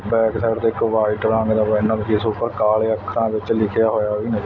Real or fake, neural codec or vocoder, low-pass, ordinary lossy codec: real; none; 7.2 kHz; none